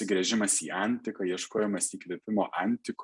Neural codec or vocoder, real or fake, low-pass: none; real; 10.8 kHz